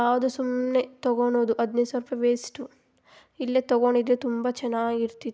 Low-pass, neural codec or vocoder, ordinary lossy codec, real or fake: none; none; none; real